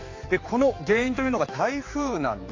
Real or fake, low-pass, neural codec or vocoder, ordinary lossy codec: fake; 7.2 kHz; codec, 16 kHz, 4 kbps, X-Codec, HuBERT features, trained on general audio; AAC, 32 kbps